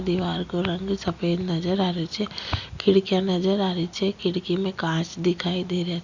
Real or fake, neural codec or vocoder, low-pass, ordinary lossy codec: real; none; 7.2 kHz; Opus, 64 kbps